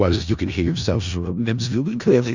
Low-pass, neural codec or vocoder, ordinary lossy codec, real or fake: 7.2 kHz; codec, 16 kHz in and 24 kHz out, 0.4 kbps, LongCat-Audio-Codec, four codebook decoder; Opus, 64 kbps; fake